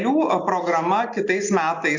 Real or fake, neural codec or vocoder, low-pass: real; none; 7.2 kHz